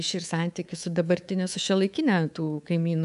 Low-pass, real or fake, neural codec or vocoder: 10.8 kHz; fake; codec, 24 kHz, 3.1 kbps, DualCodec